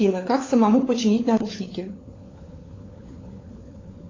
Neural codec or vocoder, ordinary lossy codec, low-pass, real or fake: codec, 16 kHz, 4 kbps, FunCodec, trained on LibriTTS, 50 frames a second; AAC, 32 kbps; 7.2 kHz; fake